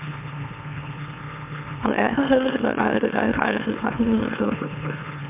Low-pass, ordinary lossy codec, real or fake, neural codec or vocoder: 3.6 kHz; MP3, 32 kbps; fake; autoencoder, 44.1 kHz, a latent of 192 numbers a frame, MeloTTS